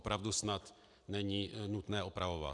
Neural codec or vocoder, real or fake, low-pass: none; real; 10.8 kHz